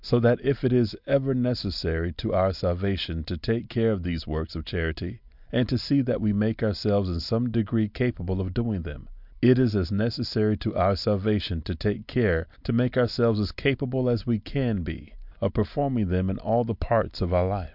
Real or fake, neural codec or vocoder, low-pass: real; none; 5.4 kHz